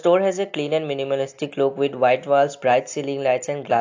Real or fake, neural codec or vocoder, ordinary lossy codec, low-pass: real; none; AAC, 48 kbps; 7.2 kHz